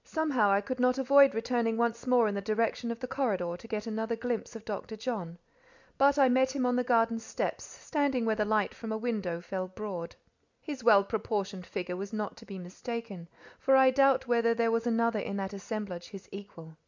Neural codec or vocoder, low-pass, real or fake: none; 7.2 kHz; real